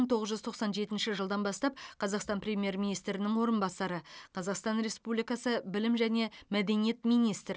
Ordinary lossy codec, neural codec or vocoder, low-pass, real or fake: none; none; none; real